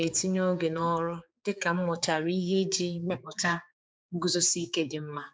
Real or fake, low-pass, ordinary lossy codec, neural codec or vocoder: fake; none; none; codec, 16 kHz, 4 kbps, X-Codec, HuBERT features, trained on general audio